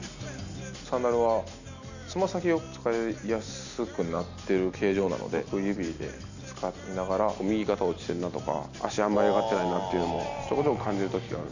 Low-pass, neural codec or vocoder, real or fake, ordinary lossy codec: 7.2 kHz; none; real; none